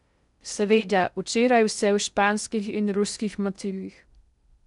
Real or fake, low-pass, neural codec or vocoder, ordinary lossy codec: fake; 10.8 kHz; codec, 16 kHz in and 24 kHz out, 0.6 kbps, FocalCodec, streaming, 2048 codes; none